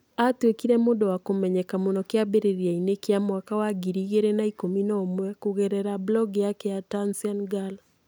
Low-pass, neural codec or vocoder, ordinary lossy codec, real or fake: none; none; none; real